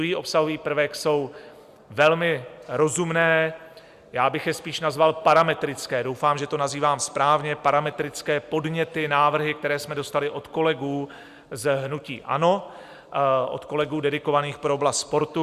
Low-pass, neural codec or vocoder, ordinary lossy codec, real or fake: 14.4 kHz; none; Opus, 64 kbps; real